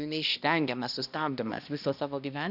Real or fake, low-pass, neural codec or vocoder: fake; 5.4 kHz; codec, 16 kHz, 1 kbps, X-Codec, HuBERT features, trained on balanced general audio